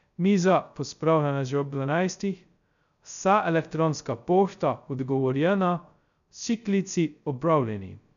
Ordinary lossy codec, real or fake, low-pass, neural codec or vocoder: none; fake; 7.2 kHz; codec, 16 kHz, 0.2 kbps, FocalCodec